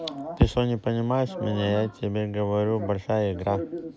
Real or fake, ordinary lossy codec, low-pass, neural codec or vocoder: real; none; none; none